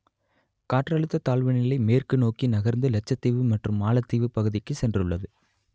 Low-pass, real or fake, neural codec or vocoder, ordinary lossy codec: none; real; none; none